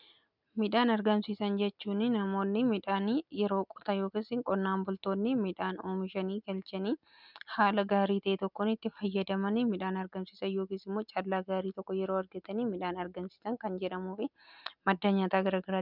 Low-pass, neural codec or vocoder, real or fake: 5.4 kHz; none; real